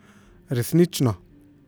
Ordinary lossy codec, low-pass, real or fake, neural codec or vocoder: none; none; real; none